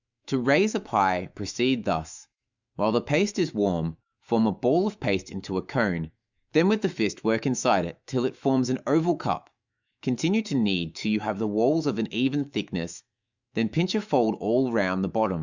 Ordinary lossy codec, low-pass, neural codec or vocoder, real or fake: Opus, 64 kbps; 7.2 kHz; autoencoder, 48 kHz, 128 numbers a frame, DAC-VAE, trained on Japanese speech; fake